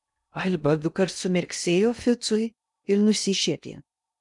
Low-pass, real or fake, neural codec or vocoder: 10.8 kHz; fake; codec, 16 kHz in and 24 kHz out, 0.6 kbps, FocalCodec, streaming, 2048 codes